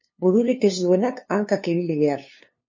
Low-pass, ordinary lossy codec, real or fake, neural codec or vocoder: 7.2 kHz; MP3, 32 kbps; fake; codec, 16 kHz, 2 kbps, FunCodec, trained on LibriTTS, 25 frames a second